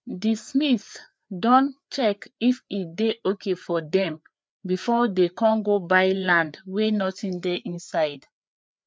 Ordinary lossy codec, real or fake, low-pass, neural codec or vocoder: none; fake; none; codec, 16 kHz, 4 kbps, FreqCodec, larger model